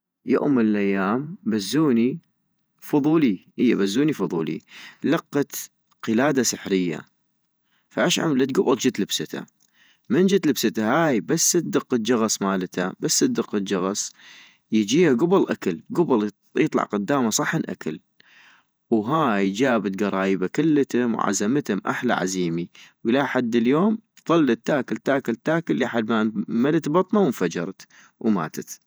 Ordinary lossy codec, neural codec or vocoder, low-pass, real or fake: none; vocoder, 48 kHz, 128 mel bands, Vocos; none; fake